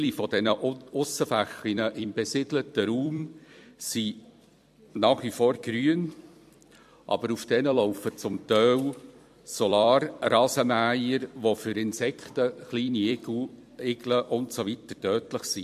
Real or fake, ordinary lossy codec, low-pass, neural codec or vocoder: fake; MP3, 64 kbps; 14.4 kHz; vocoder, 44.1 kHz, 128 mel bands every 256 samples, BigVGAN v2